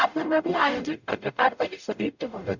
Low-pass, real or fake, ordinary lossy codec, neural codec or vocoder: 7.2 kHz; fake; none; codec, 44.1 kHz, 0.9 kbps, DAC